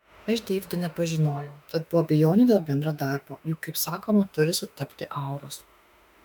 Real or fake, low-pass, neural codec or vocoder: fake; 19.8 kHz; autoencoder, 48 kHz, 32 numbers a frame, DAC-VAE, trained on Japanese speech